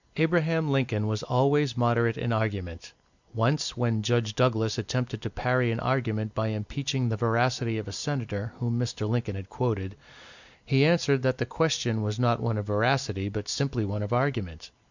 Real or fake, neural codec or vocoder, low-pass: real; none; 7.2 kHz